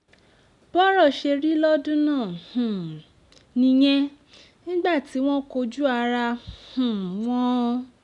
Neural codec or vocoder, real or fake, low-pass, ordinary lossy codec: none; real; 10.8 kHz; none